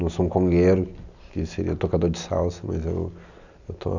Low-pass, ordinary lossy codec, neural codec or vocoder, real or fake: 7.2 kHz; none; none; real